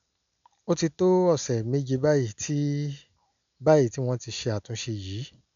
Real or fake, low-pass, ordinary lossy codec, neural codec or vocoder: real; 7.2 kHz; none; none